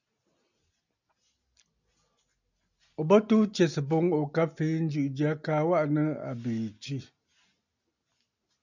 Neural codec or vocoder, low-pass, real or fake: none; 7.2 kHz; real